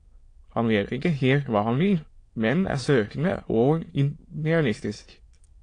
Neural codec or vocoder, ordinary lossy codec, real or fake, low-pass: autoencoder, 22.05 kHz, a latent of 192 numbers a frame, VITS, trained on many speakers; AAC, 48 kbps; fake; 9.9 kHz